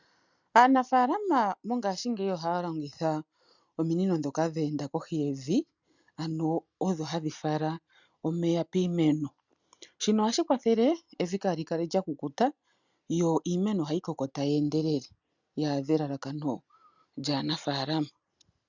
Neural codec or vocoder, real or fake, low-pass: none; real; 7.2 kHz